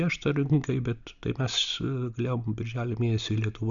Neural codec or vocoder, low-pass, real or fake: none; 7.2 kHz; real